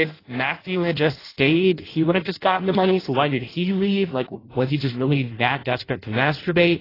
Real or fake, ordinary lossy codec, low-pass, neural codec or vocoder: fake; AAC, 24 kbps; 5.4 kHz; codec, 16 kHz in and 24 kHz out, 0.6 kbps, FireRedTTS-2 codec